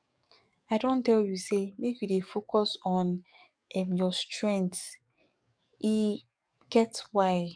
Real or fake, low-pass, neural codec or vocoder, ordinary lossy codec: fake; 9.9 kHz; codec, 44.1 kHz, 7.8 kbps, DAC; none